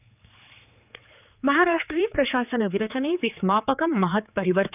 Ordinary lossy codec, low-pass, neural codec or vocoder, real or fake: none; 3.6 kHz; codec, 16 kHz, 4 kbps, X-Codec, HuBERT features, trained on general audio; fake